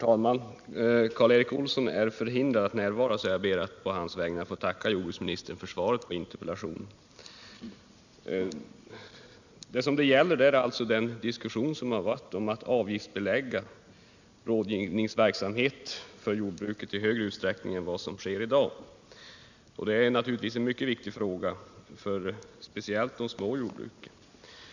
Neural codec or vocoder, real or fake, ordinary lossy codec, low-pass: none; real; none; 7.2 kHz